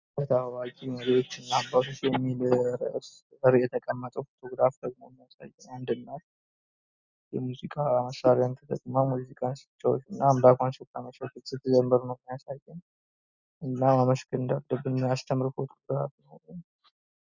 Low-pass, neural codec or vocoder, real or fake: 7.2 kHz; none; real